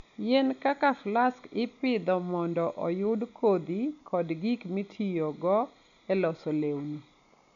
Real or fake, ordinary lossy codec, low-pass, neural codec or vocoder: real; none; 7.2 kHz; none